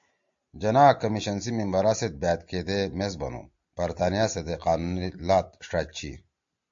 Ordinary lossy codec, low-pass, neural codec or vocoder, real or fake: AAC, 64 kbps; 7.2 kHz; none; real